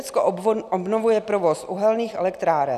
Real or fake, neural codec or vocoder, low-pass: real; none; 14.4 kHz